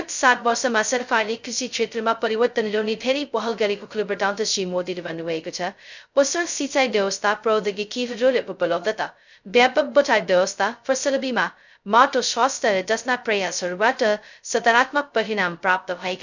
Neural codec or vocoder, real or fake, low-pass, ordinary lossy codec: codec, 16 kHz, 0.2 kbps, FocalCodec; fake; 7.2 kHz; none